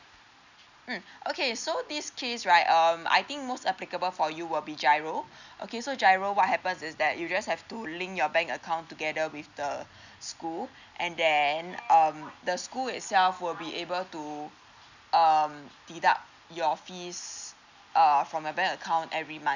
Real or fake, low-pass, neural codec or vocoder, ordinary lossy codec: real; 7.2 kHz; none; none